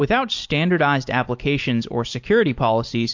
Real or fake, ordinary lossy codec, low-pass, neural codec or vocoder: real; MP3, 48 kbps; 7.2 kHz; none